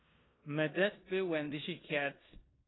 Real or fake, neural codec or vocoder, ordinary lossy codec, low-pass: fake; codec, 16 kHz in and 24 kHz out, 0.9 kbps, LongCat-Audio-Codec, four codebook decoder; AAC, 16 kbps; 7.2 kHz